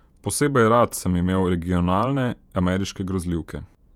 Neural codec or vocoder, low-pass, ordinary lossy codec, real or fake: vocoder, 48 kHz, 128 mel bands, Vocos; 19.8 kHz; none; fake